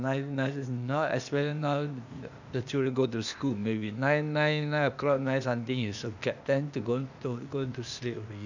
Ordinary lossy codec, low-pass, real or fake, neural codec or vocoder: none; 7.2 kHz; fake; codec, 16 kHz, 0.8 kbps, ZipCodec